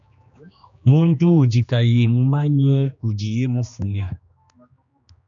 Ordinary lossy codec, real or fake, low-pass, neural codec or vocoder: MP3, 96 kbps; fake; 7.2 kHz; codec, 16 kHz, 2 kbps, X-Codec, HuBERT features, trained on general audio